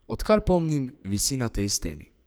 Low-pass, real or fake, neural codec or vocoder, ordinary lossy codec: none; fake; codec, 44.1 kHz, 2.6 kbps, SNAC; none